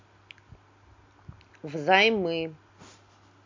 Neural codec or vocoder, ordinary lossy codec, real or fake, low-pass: none; MP3, 64 kbps; real; 7.2 kHz